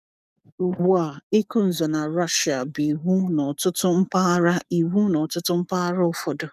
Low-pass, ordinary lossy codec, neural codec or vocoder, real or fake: 14.4 kHz; none; codec, 44.1 kHz, 7.8 kbps, DAC; fake